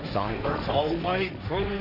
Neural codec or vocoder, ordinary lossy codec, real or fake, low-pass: codec, 16 kHz, 1.1 kbps, Voila-Tokenizer; AAC, 48 kbps; fake; 5.4 kHz